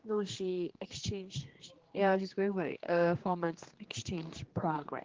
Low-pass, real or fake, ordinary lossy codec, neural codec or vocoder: 7.2 kHz; fake; Opus, 16 kbps; codec, 16 kHz, 2 kbps, X-Codec, HuBERT features, trained on general audio